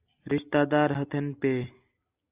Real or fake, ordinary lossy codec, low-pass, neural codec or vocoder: real; Opus, 64 kbps; 3.6 kHz; none